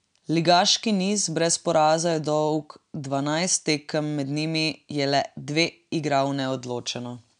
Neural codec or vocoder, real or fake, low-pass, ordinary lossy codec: none; real; 9.9 kHz; none